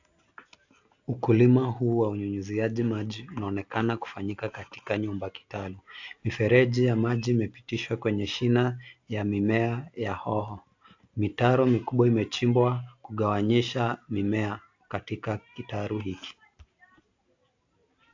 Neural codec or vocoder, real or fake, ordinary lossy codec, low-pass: none; real; AAC, 48 kbps; 7.2 kHz